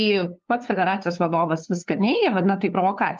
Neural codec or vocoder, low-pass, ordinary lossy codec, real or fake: codec, 16 kHz, 2 kbps, FunCodec, trained on LibriTTS, 25 frames a second; 7.2 kHz; Opus, 24 kbps; fake